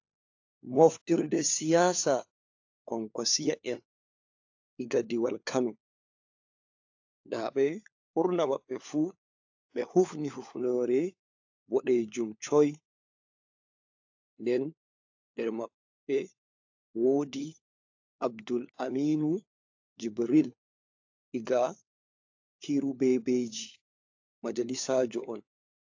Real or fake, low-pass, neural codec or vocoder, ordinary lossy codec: fake; 7.2 kHz; codec, 16 kHz, 4 kbps, FunCodec, trained on LibriTTS, 50 frames a second; AAC, 48 kbps